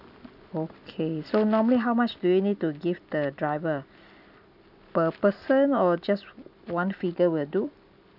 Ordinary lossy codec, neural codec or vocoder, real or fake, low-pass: none; none; real; 5.4 kHz